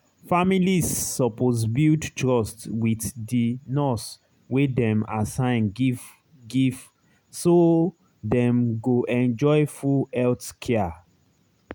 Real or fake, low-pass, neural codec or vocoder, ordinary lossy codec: real; none; none; none